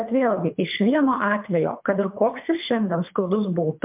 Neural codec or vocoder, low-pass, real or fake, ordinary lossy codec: codec, 24 kHz, 3 kbps, HILCodec; 3.6 kHz; fake; MP3, 32 kbps